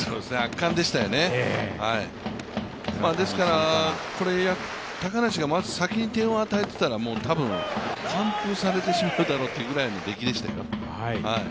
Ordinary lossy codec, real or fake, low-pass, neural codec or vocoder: none; real; none; none